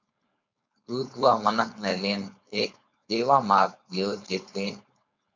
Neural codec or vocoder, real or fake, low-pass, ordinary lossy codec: codec, 16 kHz, 4.8 kbps, FACodec; fake; 7.2 kHz; MP3, 64 kbps